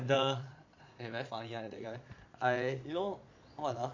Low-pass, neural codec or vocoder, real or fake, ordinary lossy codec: 7.2 kHz; vocoder, 22.05 kHz, 80 mel bands, Vocos; fake; MP3, 48 kbps